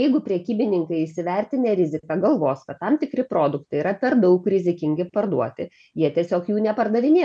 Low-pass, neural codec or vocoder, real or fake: 9.9 kHz; none; real